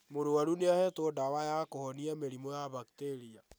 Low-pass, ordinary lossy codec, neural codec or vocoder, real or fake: none; none; none; real